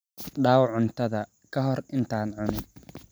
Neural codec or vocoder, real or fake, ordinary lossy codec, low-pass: none; real; none; none